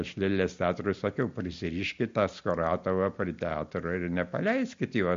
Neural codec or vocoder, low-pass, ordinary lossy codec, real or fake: none; 7.2 kHz; MP3, 48 kbps; real